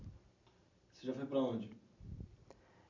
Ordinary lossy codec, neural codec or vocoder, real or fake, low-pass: none; none; real; 7.2 kHz